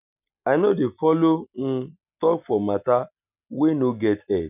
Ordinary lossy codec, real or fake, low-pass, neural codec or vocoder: none; real; 3.6 kHz; none